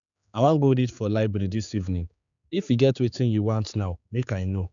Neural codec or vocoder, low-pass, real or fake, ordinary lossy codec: codec, 16 kHz, 4 kbps, X-Codec, HuBERT features, trained on general audio; 7.2 kHz; fake; none